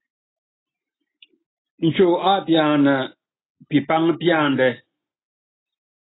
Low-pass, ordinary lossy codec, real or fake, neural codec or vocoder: 7.2 kHz; AAC, 16 kbps; real; none